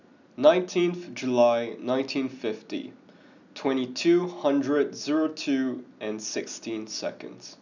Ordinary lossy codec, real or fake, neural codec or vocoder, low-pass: none; real; none; 7.2 kHz